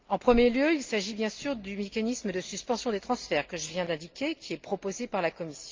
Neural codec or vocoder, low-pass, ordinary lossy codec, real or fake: none; 7.2 kHz; Opus, 24 kbps; real